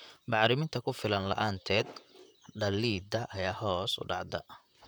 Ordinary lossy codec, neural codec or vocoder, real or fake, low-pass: none; vocoder, 44.1 kHz, 128 mel bands, Pupu-Vocoder; fake; none